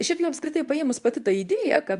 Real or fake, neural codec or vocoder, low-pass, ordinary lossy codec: fake; codec, 24 kHz, 0.9 kbps, WavTokenizer, medium speech release version 2; 10.8 kHz; Opus, 64 kbps